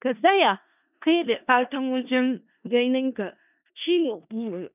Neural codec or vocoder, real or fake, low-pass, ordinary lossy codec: codec, 16 kHz in and 24 kHz out, 0.4 kbps, LongCat-Audio-Codec, four codebook decoder; fake; 3.6 kHz; none